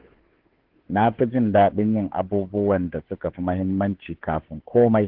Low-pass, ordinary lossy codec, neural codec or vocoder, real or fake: 5.4 kHz; Opus, 16 kbps; codec, 16 kHz, 2 kbps, FunCodec, trained on Chinese and English, 25 frames a second; fake